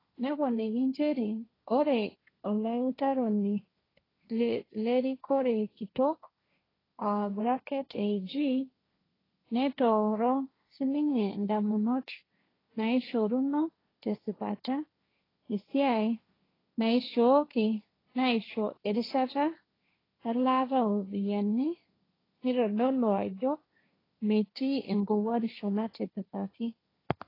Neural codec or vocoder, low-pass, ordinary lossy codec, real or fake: codec, 16 kHz, 1.1 kbps, Voila-Tokenizer; 5.4 kHz; AAC, 24 kbps; fake